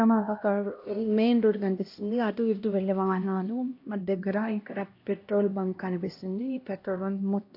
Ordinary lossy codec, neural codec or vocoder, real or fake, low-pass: AAC, 32 kbps; codec, 16 kHz, 1 kbps, X-Codec, HuBERT features, trained on LibriSpeech; fake; 5.4 kHz